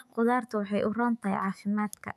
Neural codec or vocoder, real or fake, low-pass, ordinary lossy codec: autoencoder, 48 kHz, 128 numbers a frame, DAC-VAE, trained on Japanese speech; fake; 14.4 kHz; none